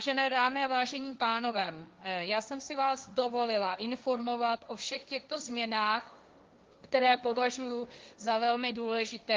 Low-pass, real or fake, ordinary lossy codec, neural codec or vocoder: 7.2 kHz; fake; Opus, 32 kbps; codec, 16 kHz, 1.1 kbps, Voila-Tokenizer